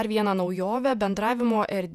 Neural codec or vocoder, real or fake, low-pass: vocoder, 48 kHz, 128 mel bands, Vocos; fake; 14.4 kHz